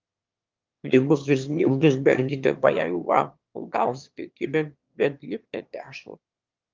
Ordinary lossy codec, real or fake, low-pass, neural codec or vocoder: Opus, 24 kbps; fake; 7.2 kHz; autoencoder, 22.05 kHz, a latent of 192 numbers a frame, VITS, trained on one speaker